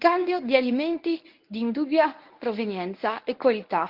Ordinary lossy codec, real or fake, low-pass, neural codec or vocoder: Opus, 24 kbps; fake; 5.4 kHz; codec, 24 kHz, 0.9 kbps, WavTokenizer, medium speech release version 1